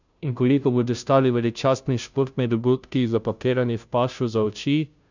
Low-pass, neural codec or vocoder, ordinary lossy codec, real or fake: 7.2 kHz; codec, 16 kHz, 0.5 kbps, FunCodec, trained on Chinese and English, 25 frames a second; none; fake